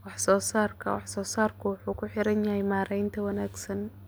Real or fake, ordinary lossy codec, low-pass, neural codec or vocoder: real; none; none; none